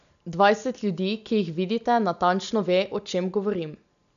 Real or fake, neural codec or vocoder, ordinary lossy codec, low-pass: real; none; none; 7.2 kHz